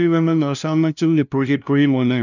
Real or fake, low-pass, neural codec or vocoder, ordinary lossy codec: fake; 7.2 kHz; codec, 16 kHz, 0.5 kbps, FunCodec, trained on LibriTTS, 25 frames a second; none